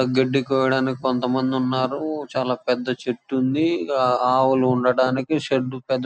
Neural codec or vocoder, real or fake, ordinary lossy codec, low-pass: none; real; none; none